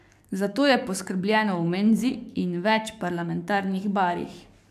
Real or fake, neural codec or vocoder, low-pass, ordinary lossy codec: fake; codec, 44.1 kHz, 7.8 kbps, DAC; 14.4 kHz; none